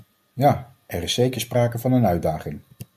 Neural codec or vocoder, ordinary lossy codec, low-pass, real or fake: none; AAC, 96 kbps; 14.4 kHz; real